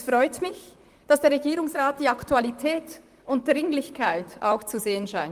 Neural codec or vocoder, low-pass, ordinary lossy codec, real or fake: vocoder, 44.1 kHz, 128 mel bands, Pupu-Vocoder; 14.4 kHz; Opus, 32 kbps; fake